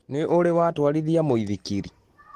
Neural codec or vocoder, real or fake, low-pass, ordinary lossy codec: none; real; 14.4 kHz; Opus, 16 kbps